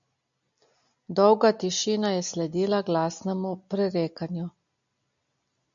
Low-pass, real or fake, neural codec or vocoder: 7.2 kHz; real; none